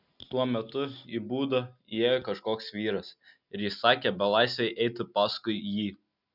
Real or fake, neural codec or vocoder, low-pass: real; none; 5.4 kHz